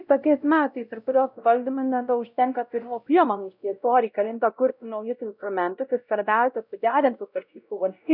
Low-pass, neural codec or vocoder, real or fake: 5.4 kHz; codec, 16 kHz, 0.5 kbps, X-Codec, WavLM features, trained on Multilingual LibriSpeech; fake